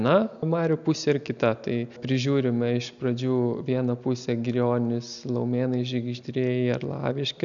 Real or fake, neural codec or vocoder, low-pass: real; none; 7.2 kHz